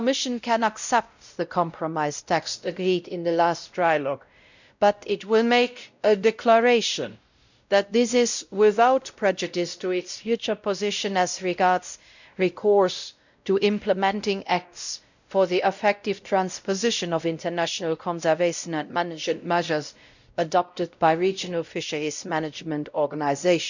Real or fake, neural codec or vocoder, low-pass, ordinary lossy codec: fake; codec, 16 kHz, 0.5 kbps, X-Codec, WavLM features, trained on Multilingual LibriSpeech; 7.2 kHz; none